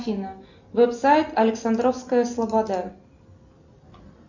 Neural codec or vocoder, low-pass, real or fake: none; 7.2 kHz; real